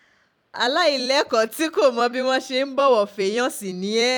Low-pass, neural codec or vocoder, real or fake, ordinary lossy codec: 19.8 kHz; vocoder, 44.1 kHz, 128 mel bands every 256 samples, BigVGAN v2; fake; none